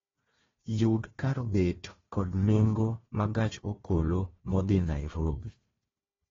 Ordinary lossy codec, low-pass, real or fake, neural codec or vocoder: AAC, 24 kbps; 7.2 kHz; fake; codec, 16 kHz, 1 kbps, FunCodec, trained on Chinese and English, 50 frames a second